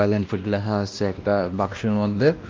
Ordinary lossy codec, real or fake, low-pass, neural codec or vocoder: Opus, 24 kbps; fake; 7.2 kHz; codec, 16 kHz, 1 kbps, X-Codec, WavLM features, trained on Multilingual LibriSpeech